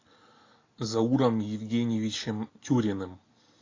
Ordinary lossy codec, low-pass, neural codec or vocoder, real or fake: AAC, 32 kbps; 7.2 kHz; none; real